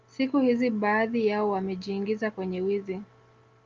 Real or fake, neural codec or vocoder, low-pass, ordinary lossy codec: real; none; 7.2 kHz; Opus, 24 kbps